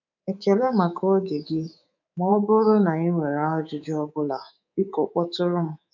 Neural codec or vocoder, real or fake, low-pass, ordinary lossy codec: codec, 24 kHz, 3.1 kbps, DualCodec; fake; 7.2 kHz; none